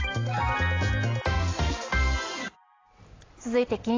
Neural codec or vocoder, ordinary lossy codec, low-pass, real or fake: none; AAC, 32 kbps; 7.2 kHz; real